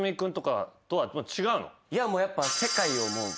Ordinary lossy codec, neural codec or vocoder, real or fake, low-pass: none; none; real; none